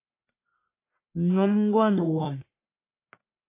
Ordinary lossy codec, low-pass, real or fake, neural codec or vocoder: AAC, 24 kbps; 3.6 kHz; fake; codec, 44.1 kHz, 1.7 kbps, Pupu-Codec